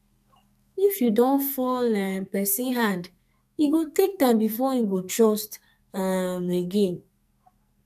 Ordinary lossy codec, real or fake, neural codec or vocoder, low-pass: none; fake; codec, 44.1 kHz, 2.6 kbps, SNAC; 14.4 kHz